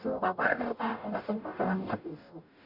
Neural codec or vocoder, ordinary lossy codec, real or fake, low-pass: codec, 44.1 kHz, 0.9 kbps, DAC; none; fake; 5.4 kHz